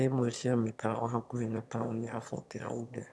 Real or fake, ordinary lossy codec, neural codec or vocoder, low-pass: fake; none; autoencoder, 22.05 kHz, a latent of 192 numbers a frame, VITS, trained on one speaker; none